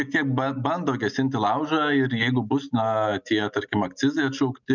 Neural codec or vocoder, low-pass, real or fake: none; 7.2 kHz; real